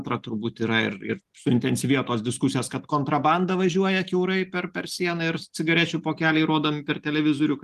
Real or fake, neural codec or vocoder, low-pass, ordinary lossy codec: real; none; 14.4 kHz; Opus, 24 kbps